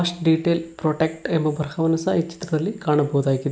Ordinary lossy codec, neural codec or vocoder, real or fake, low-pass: none; none; real; none